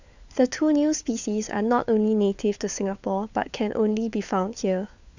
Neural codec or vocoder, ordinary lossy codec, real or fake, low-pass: codec, 16 kHz, 4 kbps, FunCodec, trained on LibriTTS, 50 frames a second; none; fake; 7.2 kHz